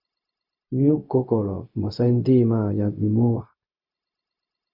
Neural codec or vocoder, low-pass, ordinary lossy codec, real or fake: codec, 16 kHz, 0.4 kbps, LongCat-Audio-Codec; 5.4 kHz; Opus, 64 kbps; fake